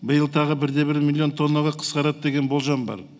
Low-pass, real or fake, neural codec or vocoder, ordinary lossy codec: none; real; none; none